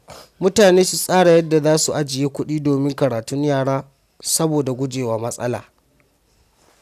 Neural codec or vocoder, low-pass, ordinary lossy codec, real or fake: none; 14.4 kHz; none; real